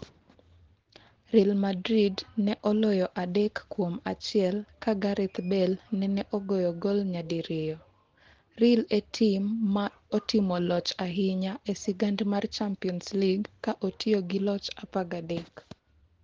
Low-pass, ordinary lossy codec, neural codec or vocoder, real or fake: 7.2 kHz; Opus, 16 kbps; none; real